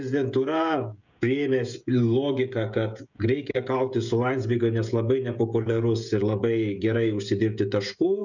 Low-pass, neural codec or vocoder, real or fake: 7.2 kHz; codec, 16 kHz, 16 kbps, FreqCodec, smaller model; fake